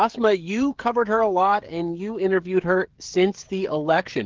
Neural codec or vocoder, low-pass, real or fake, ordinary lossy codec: codec, 16 kHz, 16 kbps, FreqCodec, smaller model; 7.2 kHz; fake; Opus, 16 kbps